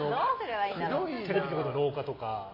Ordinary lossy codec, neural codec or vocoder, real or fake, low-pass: MP3, 24 kbps; none; real; 5.4 kHz